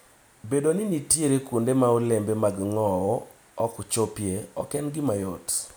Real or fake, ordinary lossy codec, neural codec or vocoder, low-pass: real; none; none; none